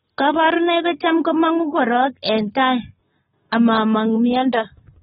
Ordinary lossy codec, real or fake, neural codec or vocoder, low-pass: AAC, 16 kbps; fake; codec, 44.1 kHz, 7.8 kbps, Pupu-Codec; 19.8 kHz